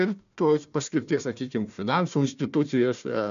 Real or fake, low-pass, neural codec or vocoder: fake; 7.2 kHz; codec, 16 kHz, 1 kbps, FunCodec, trained on Chinese and English, 50 frames a second